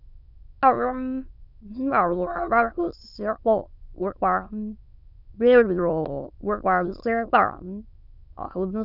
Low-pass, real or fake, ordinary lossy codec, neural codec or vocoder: 5.4 kHz; fake; none; autoencoder, 22.05 kHz, a latent of 192 numbers a frame, VITS, trained on many speakers